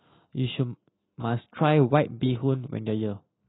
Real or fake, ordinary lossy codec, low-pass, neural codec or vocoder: real; AAC, 16 kbps; 7.2 kHz; none